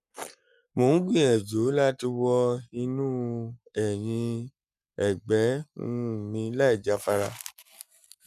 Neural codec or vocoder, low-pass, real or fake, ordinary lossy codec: codec, 44.1 kHz, 7.8 kbps, Pupu-Codec; 14.4 kHz; fake; none